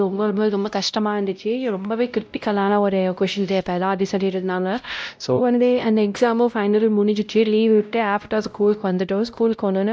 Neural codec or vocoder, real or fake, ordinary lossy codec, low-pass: codec, 16 kHz, 0.5 kbps, X-Codec, WavLM features, trained on Multilingual LibriSpeech; fake; none; none